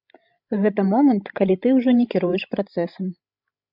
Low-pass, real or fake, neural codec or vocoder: 5.4 kHz; fake; codec, 16 kHz, 16 kbps, FreqCodec, larger model